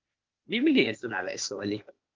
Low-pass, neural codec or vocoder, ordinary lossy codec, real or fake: 7.2 kHz; codec, 16 kHz, 0.8 kbps, ZipCodec; Opus, 32 kbps; fake